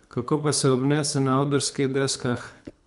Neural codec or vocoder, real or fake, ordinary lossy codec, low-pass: codec, 24 kHz, 3 kbps, HILCodec; fake; none; 10.8 kHz